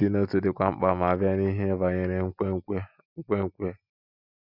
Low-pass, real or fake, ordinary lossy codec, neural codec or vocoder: 5.4 kHz; real; none; none